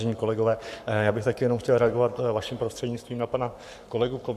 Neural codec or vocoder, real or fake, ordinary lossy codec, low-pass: codec, 44.1 kHz, 7.8 kbps, Pupu-Codec; fake; AAC, 96 kbps; 14.4 kHz